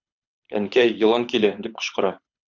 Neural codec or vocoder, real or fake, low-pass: codec, 24 kHz, 6 kbps, HILCodec; fake; 7.2 kHz